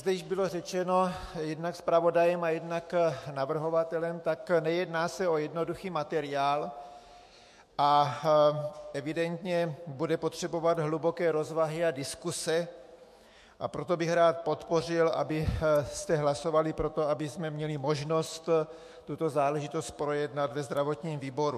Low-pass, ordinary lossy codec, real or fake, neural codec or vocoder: 14.4 kHz; MP3, 64 kbps; fake; autoencoder, 48 kHz, 128 numbers a frame, DAC-VAE, trained on Japanese speech